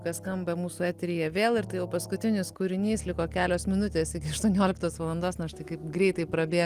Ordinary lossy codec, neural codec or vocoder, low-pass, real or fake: Opus, 24 kbps; none; 14.4 kHz; real